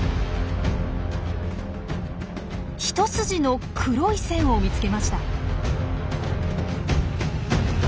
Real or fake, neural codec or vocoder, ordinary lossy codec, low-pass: real; none; none; none